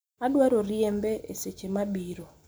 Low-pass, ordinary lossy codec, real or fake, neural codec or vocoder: none; none; real; none